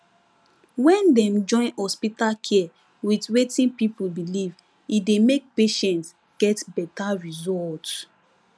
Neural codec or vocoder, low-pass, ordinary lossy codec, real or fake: none; none; none; real